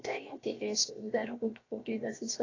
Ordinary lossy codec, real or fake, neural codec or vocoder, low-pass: AAC, 32 kbps; fake; codec, 16 kHz, 0.7 kbps, FocalCodec; 7.2 kHz